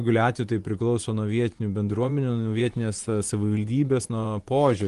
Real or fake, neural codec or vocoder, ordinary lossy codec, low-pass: fake; vocoder, 24 kHz, 100 mel bands, Vocos; Opus, 24 kbps; 10.8 kHz